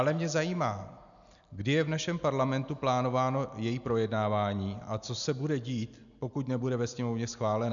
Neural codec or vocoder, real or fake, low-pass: none; real; 7.2 kHz